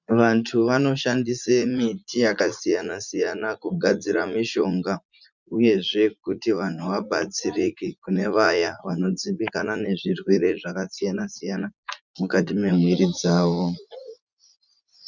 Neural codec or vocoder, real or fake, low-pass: vocoder, 44.1 kHz, 80 mel bands, Vocos; fake; 7.2 kHz